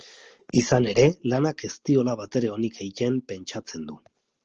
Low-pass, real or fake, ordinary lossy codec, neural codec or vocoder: 7.2 kHz; real; Opus, 16 kbps; none